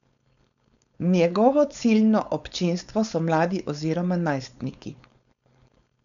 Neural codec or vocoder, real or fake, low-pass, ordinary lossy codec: codec, 16 kHz, 4.8 kbps, FACodec; fake; 7.2 kHz; none